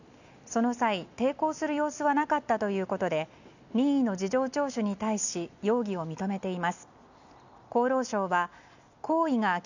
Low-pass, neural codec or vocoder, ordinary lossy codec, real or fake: 7.2 kHz; none; none; real